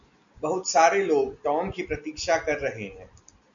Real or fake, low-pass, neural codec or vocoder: real; 7.2 kHz; none